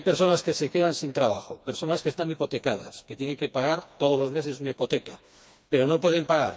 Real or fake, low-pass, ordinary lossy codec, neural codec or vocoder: fake; none; none; codec, 16 kHz, 2 kbps, FreqCodec, smaller model